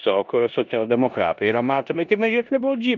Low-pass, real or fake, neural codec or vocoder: 7.2 kHz; fake; codec, 16 kHz in and 24 kHz out, 0.9 kbps, LongCat-Audio-Codec, four codebook decoder